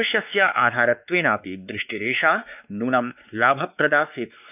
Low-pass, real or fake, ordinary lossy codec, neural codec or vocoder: 3.6 kHz; fake; none; codec, 16 kHz, 2 kbps, X-Codec, WavLM features, trained on Multilingual LibriSpeech